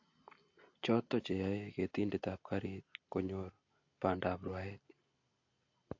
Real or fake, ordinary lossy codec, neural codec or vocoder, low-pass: real; AAC, 48 kbps; none; 7.2 kHz